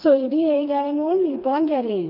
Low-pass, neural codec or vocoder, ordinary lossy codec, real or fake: 5.4 kHz; codec, 16 kHz, 2 kbps, FreqCodec, smaller model; none; fake